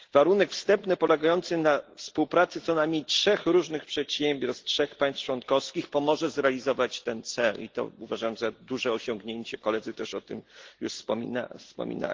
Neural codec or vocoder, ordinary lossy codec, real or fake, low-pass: none; Opus, 16 kbps; real; 7.2 kHz